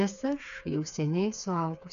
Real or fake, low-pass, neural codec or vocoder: fake; 7.2 kHz; codec, 16 kHz, 4 kbps, FreqCodec, smaller model